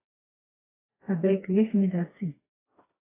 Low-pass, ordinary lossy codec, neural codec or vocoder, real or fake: 3.6 kHz; AAC, 16 kbps; codec, 16 kHz, 2 kbps, FreqCodec, smaller model; fake